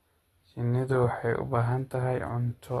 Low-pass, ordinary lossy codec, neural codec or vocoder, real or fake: 19.8 kHz; AAC, 32 kbps; none; real